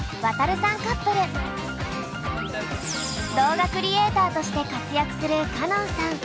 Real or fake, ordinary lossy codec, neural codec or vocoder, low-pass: real; none; none; none